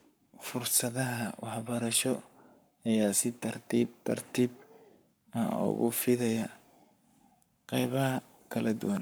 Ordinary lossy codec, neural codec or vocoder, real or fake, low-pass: none; codec, 44.1 kHz, 7.8 kbps, Pupu-Codec; fake; none